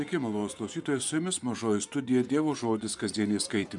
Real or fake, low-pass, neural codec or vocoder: fake; 10.8 kHz; vocoder, 24 kHz, 100 mel bands, Vocos